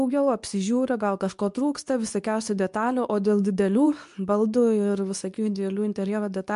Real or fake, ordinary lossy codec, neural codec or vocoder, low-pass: fake; MP3, 64 kbps; codec, 24 kHz, 0.9 kbps, WavTokenizer, medium speech release version 2; 10.8 kHz